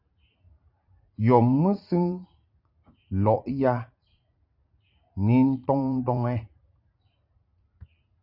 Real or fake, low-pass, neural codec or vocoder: fake; 5.4 kHz; vocoder, 44.1 kHz, 80 mel bands, Vocos